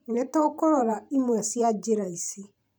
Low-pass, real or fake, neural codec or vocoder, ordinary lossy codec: none; fake; vocoder, 44.1 kHz, 128 mel bands every 512 samples, BigVGAN v2; none